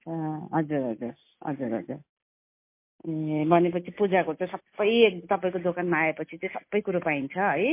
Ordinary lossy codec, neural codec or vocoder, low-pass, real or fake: MP3, 24 kbps; none; 3.6 kHz; real